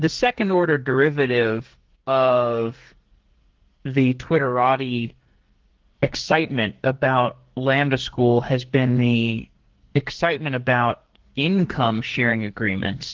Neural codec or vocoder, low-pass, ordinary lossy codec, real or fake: codec, 44.1 kHz, 2.6 kbps, SNAC; 7.2 kHz; Opus, 16 kbps; fake